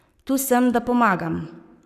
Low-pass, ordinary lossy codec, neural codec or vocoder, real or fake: 14.4 kHz; none; codec, 44.1 kHz, 7.8 kbps, Pupu-Codec; fake